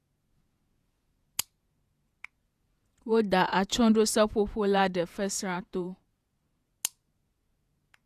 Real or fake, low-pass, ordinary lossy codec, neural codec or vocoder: fake; 14.4 kHz; Opus, 64 kbps; vocoder, 48 kHz, 128 mel bands, Vocos